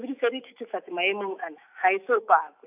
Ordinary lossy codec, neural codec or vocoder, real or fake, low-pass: AAC, 32 kbps; autoencoder, 48 kHz, 128 numbers a frame, DAC-VAE, trained on Japanese speech; fake; 3.6 kHz